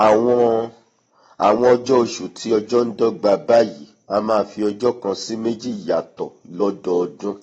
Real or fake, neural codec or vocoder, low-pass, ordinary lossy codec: real; none; 19.8 kHz; AAC, 24 kbps